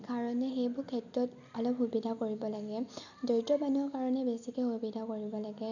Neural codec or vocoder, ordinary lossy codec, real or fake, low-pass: none; none; real; 7.2 kHz